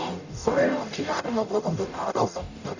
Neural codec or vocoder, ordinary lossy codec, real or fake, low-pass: codec, 44.1 kHz, 0.9 kbps, DAC; none; fake; 7.2 kHz